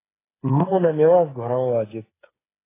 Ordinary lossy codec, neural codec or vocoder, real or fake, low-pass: AAC, 16 kbps; codec, 16 kHz, 8 kbps, FreqCodec, smaller model; fake; 3.6 kHz